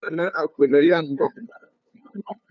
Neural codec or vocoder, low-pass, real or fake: codec, 16 kHz, 2 kbps, FunCodec, trained on LibriTTS, 25 frames a second; 7.2 kHz; fake